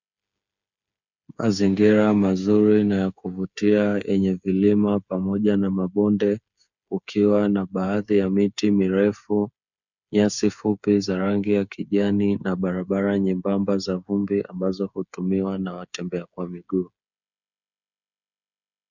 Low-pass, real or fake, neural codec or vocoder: 7.2 kHz; fake; codec, 16 kHz, 8 kbps, FreqCodec, smaller model